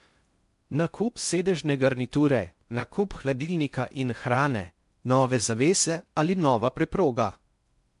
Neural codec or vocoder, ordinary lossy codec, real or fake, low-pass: codec, 16 kHz in and 24 kHz out, 0.6 kbps, FocalCodec, streaming, 4096 codes; MP3, 64 kbps; fake; 10.8 kHz